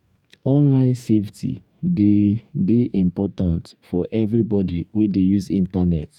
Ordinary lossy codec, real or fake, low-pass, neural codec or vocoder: none; fake; 19.8 kHz; codec, 44.1 kHz, 2.6 kbps, DAC